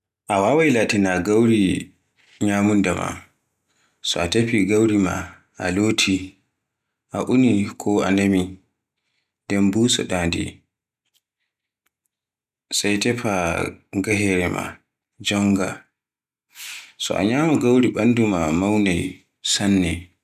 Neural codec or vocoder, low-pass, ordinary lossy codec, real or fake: none; 14.4 kHz; none; real